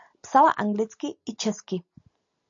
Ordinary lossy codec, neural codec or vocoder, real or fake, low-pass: AAC, 64 kbps; none; real; 7.2 kHz